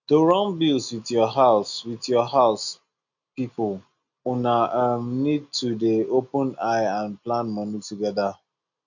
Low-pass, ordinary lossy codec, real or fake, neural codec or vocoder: 7.2 kHz; none; real; none